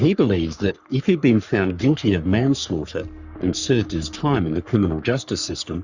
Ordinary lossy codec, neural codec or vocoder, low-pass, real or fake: Opus, 64 kbps; codec, 44.1 kHz, 3.4 kbps, Pupu-Codec; 7.2 kHz; fake